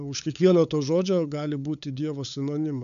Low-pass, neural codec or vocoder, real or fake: 7.2 kHz; codec, 16 kHz, 8 kbps, FunCodec, trained on LibriTTS, 25 frames a second; fake